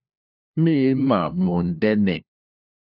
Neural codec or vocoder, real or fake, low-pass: codec, 16 kHz, 1 kbps, FunCodec, trained on LibriTTS, 50 frames a second; fake; 5.4 kHz